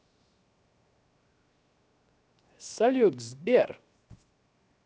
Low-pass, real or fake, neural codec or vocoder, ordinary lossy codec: none; fake; codec, 16 kHz, 0.7 kbps, FocalCodec; none